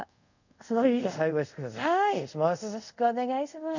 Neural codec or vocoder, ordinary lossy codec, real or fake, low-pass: codec, 24 kHz, 0.5 kbps, DualCodec; none; fake; 7.2 kHz